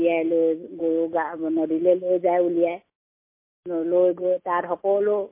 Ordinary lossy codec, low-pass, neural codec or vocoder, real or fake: MP3, 24 kbps; 3.6 kHz; none; real